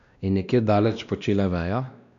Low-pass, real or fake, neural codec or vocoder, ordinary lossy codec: 7.2 kHz; fake; codec, 16 kHz, 1 kbps, X-Codec, WavLM features, trained on Multilingual LibriSpeech; none